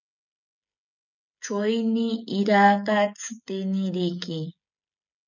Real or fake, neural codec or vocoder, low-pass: fake; codec, 16 kHz, 16 kbps, FreqCodec, smaller model; 7.2 kHz